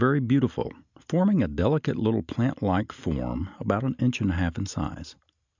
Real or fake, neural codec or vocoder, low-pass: real; none; 7.2 kHz